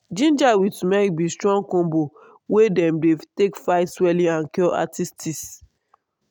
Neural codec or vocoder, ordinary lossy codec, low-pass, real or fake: none; none; none; real